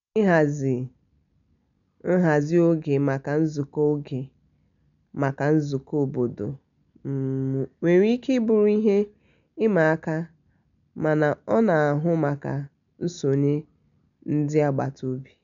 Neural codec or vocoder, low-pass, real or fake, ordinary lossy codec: none; 7.2 kHz; real; none